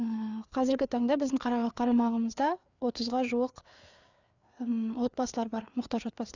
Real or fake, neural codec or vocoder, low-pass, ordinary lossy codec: fake; codec, 16 kHz, 4 kbps, FreqCodec, larger model; 7.2 kHz; none